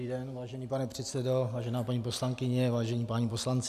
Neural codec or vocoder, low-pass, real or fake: none; 14.4 kHz; real